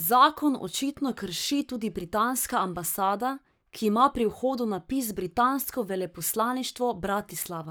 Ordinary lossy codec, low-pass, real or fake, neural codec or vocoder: none; none; fake; codec, 44.1 kHz, 7.8 kbps, Pupu-Codec